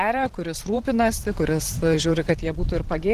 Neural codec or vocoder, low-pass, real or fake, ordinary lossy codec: vocoder, 44.1 kHz, 128 mel bands every 512 samples, BigVGAN v2; 14.4 kHz; fake; Opus, 16 kbps